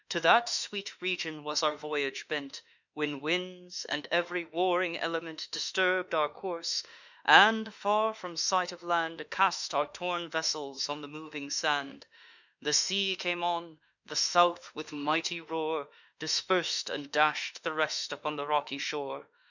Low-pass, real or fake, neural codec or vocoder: 7.2 kHz; fake; autoencoder, 48 kHz, 32 numbers a frame, DAC-VAE, trained on Japanese speech